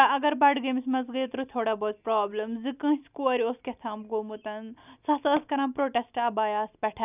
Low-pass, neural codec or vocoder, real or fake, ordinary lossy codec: 3.6 kHz; none; real; none